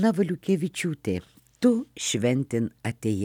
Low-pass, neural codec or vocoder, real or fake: 19.8 kHz; none; real